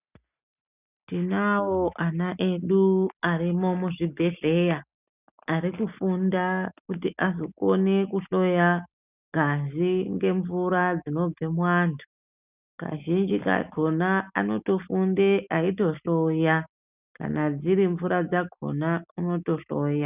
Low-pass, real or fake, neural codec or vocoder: 3.6 kHz; real; none